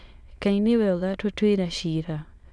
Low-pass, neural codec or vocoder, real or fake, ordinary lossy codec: none; autoencoder, 22.05 kHz, a latent of 192 numbers a frame, VITS, trained on many speakers; fake; none